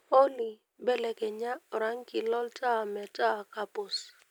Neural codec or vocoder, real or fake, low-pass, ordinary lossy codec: none; real; none; none